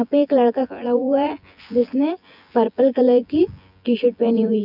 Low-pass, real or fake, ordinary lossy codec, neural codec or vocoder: 5.4 kHz; fake; none; vocoder, 24 kHz, 100 mel bands, Vocos